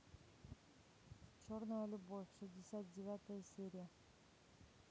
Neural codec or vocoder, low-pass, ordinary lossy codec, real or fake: none; none; none; real